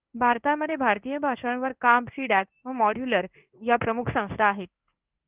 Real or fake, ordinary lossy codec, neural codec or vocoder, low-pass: fake; Opus, 32 kbps; codec, 16 kHz in and 24 kHz out, 1 kbps, XY-Tokenizer; 3.6 kHz